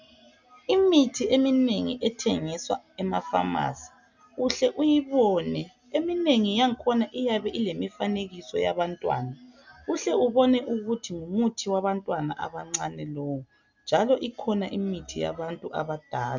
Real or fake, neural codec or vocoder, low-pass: real; none; 7.2 kHz